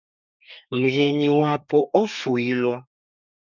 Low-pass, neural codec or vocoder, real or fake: 7.2 kHz; codec, 32 kHz, 1.9 kbps, SNAC; fake